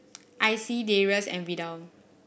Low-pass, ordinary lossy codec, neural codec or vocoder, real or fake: none; none; none; real